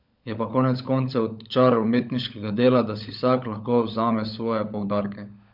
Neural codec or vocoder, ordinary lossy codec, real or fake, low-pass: codec, 16 kHz, 16 kbps, FunCodec, trained on LibriTTS, 50 frames a second; none; fake; 5.4 kHz